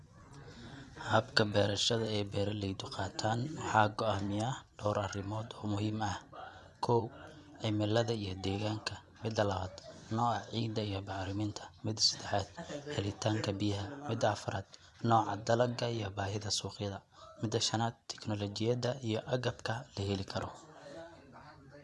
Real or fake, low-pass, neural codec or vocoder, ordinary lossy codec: fake; none; vocoder, 24 kHz, 100 mel bands, Vocos; none